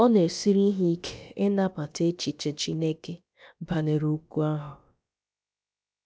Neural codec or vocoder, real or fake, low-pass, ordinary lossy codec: codec, 16 kHz, about 1 kbps, DyCAST, with the encoder's durations; fake; none; none